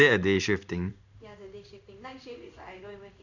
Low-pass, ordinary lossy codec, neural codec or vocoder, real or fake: 7.2 kHz; none; codec, 16 kHz in and 24 kHz out, 1 kbps, XY-Tokenizer; fake